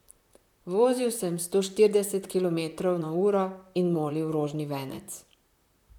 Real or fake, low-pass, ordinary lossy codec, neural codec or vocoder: fake; 19.8 kHz; none; vocoder, 44.1 kHz, 128 mel bands, Pupu-Vocoder